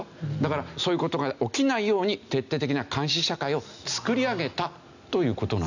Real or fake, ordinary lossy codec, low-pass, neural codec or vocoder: real; none; 7.2 kHz; none